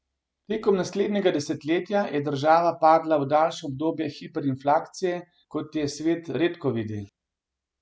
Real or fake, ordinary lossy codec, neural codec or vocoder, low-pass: real; none; none; none